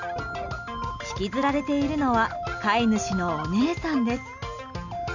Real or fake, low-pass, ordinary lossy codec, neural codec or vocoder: real; 7.2 kHz; none; none